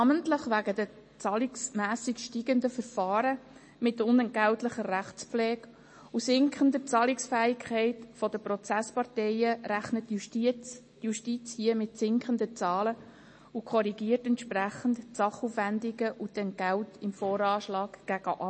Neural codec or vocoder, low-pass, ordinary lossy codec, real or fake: none; 9.9 kHz; MP3, 32 kbps; real